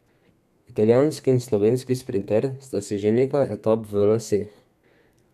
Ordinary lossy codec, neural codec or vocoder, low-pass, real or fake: none; codec, 32 kHz, 1.9 kbps, SNAC; 14.4 kHz; fake